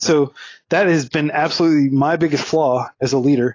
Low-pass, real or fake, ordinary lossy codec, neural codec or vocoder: 7.2 kHz; real; AAC, 32 kbps; none